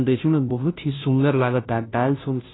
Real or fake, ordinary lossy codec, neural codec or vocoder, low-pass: fake; AAC, 16 kbps; codec, 16 kHz, 0.5 kbps, FunCodec, trained on Chinese and English, 25 frames a second; 7.2 kHz